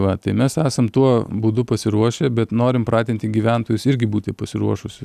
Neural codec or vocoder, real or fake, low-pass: none; real; 14.4 kHz